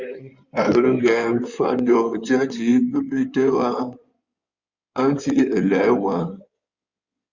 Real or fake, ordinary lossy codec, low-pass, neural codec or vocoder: fake; Opus, 64 kbps; 7.2 kHz; codec, 16 kHz in and 24 kHz out, 2.2 kbps, FireRedTTS-2 codec